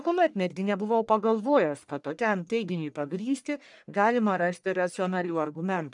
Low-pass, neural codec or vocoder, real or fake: 10.8 kHz; codec, 44.1 kHz, 1.7 kbps, Pupu-Codec; fake